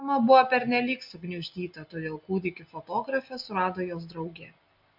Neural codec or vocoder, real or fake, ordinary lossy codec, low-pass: none; real; MP3, 48 kbps; 5.4 kHz